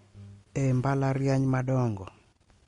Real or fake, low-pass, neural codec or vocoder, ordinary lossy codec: real; 10.8 kHz; none; MP3, 48 kbps